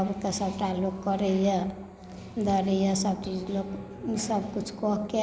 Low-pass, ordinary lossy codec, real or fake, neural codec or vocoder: none; none; real; none